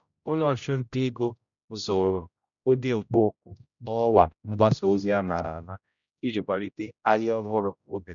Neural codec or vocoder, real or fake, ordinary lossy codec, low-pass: codec, 16 kHz, 0.5 kbps, X-Codec, HuBERT features, trained on general audio; fake; none; 7.2 kHz